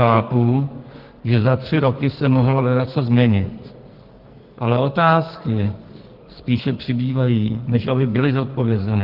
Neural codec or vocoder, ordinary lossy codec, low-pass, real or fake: codec, 44.1 kHz, 2.6 kbps, SNAC; Opus, 16 kbps; 5.4 kHz; fake